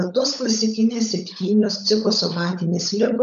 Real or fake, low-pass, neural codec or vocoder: fake; 7.2 kHz; codec, 16 kHz, 16 kbps, FunCodec, trained on LibriTTS, 50 frames a second